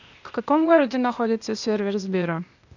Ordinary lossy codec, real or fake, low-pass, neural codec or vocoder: none; fake; 7.2 kHz; codec, 16 kHz, 0.8 kbps, ZipCodec